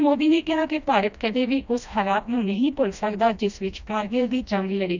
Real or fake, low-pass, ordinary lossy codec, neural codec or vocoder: fake; 7.2 kHz; none; codec, 16 kHz, 1 kbps, FreqCodec, smaller model